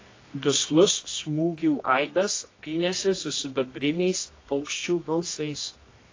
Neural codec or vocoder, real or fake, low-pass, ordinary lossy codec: codec, 24 kHz, 0.9 kbps, WavTokenizer, medium music audio release; fake; 7.2 kHz; AAC, 32 kbps